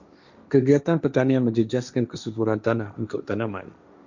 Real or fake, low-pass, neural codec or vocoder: fake; 7.2 kHz; codec, 16 kHz, 1.1 kbps, Voila-Tokenizer